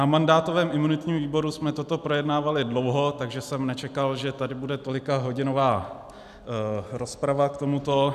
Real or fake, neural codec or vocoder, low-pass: real; none; 14.4 kHz